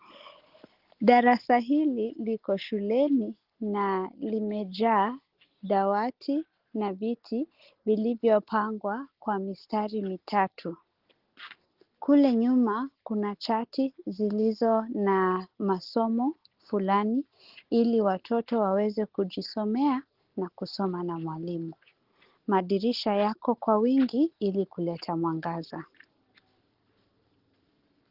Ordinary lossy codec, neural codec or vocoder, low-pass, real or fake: Opus, 16 kbps; none; 5.4 kHz; real